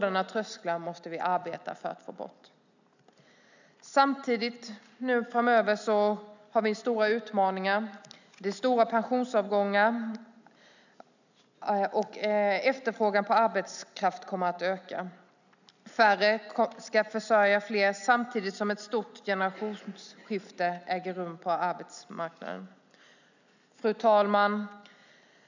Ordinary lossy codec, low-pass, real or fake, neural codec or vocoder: none; 7.2 kHz; real; none